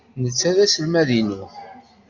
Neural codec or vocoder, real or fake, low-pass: none; real; 7.2 kHz